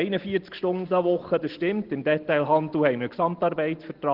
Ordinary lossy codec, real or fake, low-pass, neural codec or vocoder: Opus, 16 kbps; real; 5.4 kHz; none